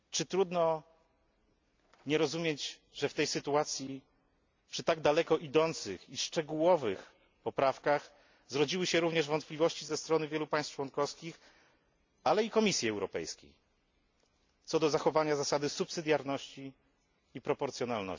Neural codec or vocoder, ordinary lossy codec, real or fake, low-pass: none; MP3, 64 kbps; real; 7.2 kHz